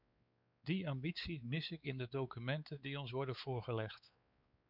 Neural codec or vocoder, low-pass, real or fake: codec, 16 kHz, 4 kbps, X-Codec, WavLM features, trained on Multilingual LibriSpeech; 5.4 kHz; fake